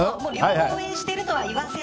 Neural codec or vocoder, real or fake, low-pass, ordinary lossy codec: none; real; none; none